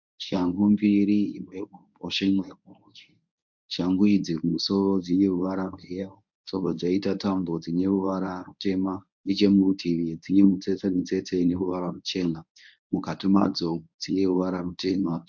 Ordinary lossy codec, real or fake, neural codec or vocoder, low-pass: MP3, 64 kbps; fake; codec, 24 kHz, 0.9 kbps, WavTokenizer, medium speech release version 1; 7.2 kHz